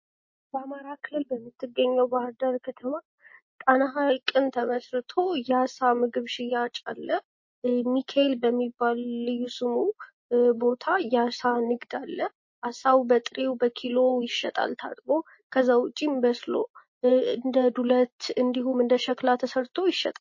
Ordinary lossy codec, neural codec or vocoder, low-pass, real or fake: MP3, 32 kbps; none; 7.2 kHz; real